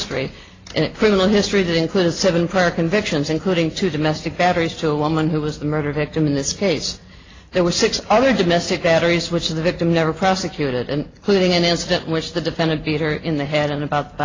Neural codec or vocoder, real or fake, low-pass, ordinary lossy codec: none; real; 7.2 kHz; AAC, 32 kbps